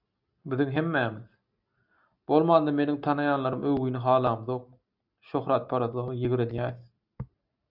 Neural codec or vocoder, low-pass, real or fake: none; 5.4 kHz; real